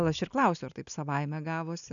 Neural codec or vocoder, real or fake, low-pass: none; real; 7.2 kHz